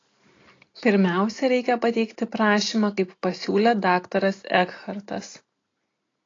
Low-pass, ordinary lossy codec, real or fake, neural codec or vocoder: 7.2 kHz; AAC, 32 kbps; real; none